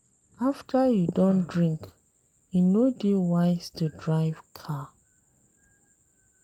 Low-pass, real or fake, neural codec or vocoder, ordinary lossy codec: 19.8 kHz; real; none; Opus, 32 kbps